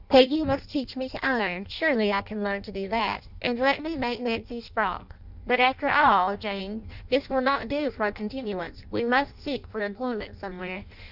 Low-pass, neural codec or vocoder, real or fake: 5.4 kHz; codec, 16 kHz in and 24 kHz out, 0.6 kbps, FireRedTTS-2 codec; fake